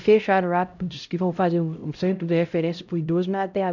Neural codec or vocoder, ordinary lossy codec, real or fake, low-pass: codec, 16 kHz, 0.5 kbps, X-Codec, HuBERT features, trained on LibriSpeech; none; fake; 7.2 kHz